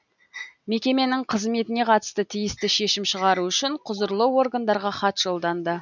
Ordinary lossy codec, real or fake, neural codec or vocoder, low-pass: none; real; none; 7.2 kHz